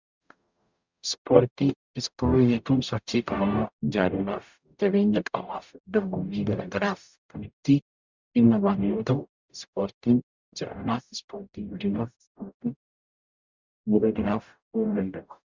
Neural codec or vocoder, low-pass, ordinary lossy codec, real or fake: codec, 44.1 kHz, 0.9 kbps, DAC; 7.2 kHz; Opus, 64 kbps; fake